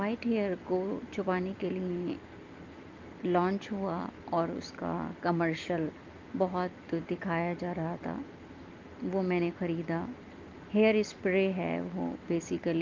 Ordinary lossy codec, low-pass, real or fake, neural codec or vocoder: Opus, 24 kbps; 7.2 kHz; real; none